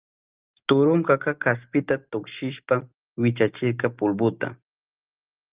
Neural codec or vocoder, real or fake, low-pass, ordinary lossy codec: none; real; 3.6 kHz; Opus, 32 kbps